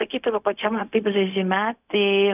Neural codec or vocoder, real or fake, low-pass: codec, 16 kHz, 0.4 kbps, LongCat-Audio-Codec; fake; 3.6 kHz